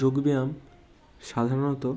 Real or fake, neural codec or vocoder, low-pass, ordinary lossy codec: real; none; none; none